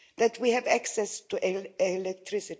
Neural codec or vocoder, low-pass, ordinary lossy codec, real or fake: none; none; none; real